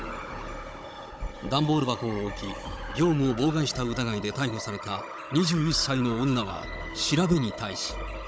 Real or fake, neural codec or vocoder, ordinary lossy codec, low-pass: fake; codec, 16 kHz, 16 kbps, FunCodec, trained on Chinese and English, 50 frames a second; none; none